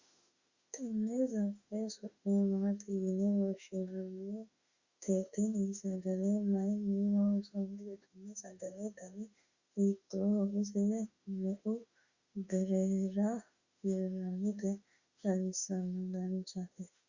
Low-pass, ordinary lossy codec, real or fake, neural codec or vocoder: 7.2 kHz; Opus, 64 kbps; fake; autoencoder, 48 kHz, 32 numbers a frame, DAC-VAE, trained on Japanese speech